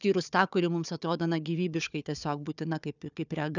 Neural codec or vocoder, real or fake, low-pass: codec, 16 kHz, 4 kbps, FunCodec, trained on Chinese and English, 50 frames a second; fake; 7.2 kHz